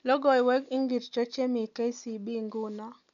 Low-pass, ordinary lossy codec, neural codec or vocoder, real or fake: 7.2 kHz; none; none; real